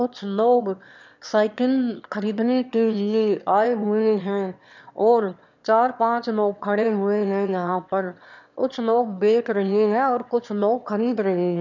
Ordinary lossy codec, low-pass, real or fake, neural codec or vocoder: none; 7.2 kHz; fake; autoencoder, 22.05 kHz, a latent of 192 numbers a frame, VITS, trained on one speaker